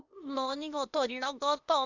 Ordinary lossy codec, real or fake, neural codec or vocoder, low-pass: none; fake; codec, 16 kHz, 0.8 kbps, ZipCodec; 7.2 kHz